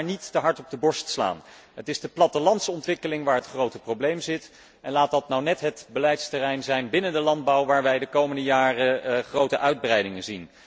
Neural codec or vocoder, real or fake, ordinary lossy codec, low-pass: none; real; none; none